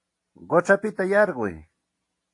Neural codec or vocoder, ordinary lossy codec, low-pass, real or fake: none; AAC, 48 kbps; 10.8 kHz; real